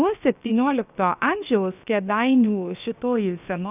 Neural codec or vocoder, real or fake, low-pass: codec, 16 kHz, about 1 kbps, DyCAST, with the encoder's durations; fake; 3.6 kHz